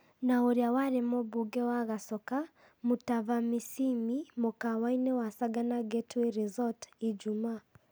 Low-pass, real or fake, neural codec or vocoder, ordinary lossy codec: none; real; none; none